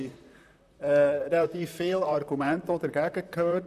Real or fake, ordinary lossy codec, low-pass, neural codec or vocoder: fake; none; 14.4 kHz; vocoder, 44.1 kHz, 128 mel bands, Pupu-Vocoder